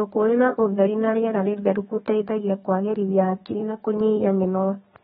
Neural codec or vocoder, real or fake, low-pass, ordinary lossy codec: codec, 32 kHz, 1.9 kbps, SNAC; fake; 14.4 kHz; AAC, 16 kbps